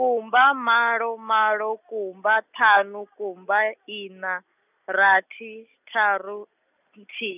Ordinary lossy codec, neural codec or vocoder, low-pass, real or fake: none; none; 3.6 kHz; real